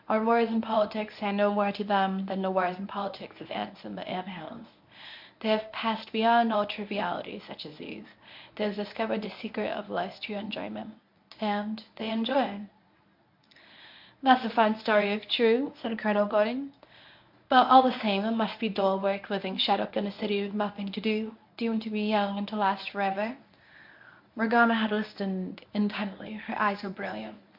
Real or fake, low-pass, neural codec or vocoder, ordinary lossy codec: fake; 5.4 kHz; codec, 24 kHz, 0.9 kbps, WavTokenizer, medium speech release version 1; MP3, 48 kbps